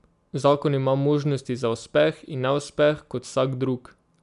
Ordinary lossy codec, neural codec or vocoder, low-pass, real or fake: none; none; 10.8 kHz; real